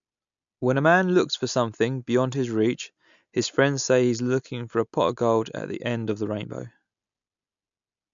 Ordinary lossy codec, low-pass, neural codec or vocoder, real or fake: MP3, 64 kbps; 7.2 kHz; none; real